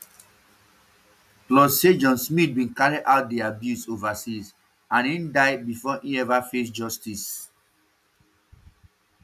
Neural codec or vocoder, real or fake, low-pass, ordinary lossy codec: none; real; 14.4 kHz; none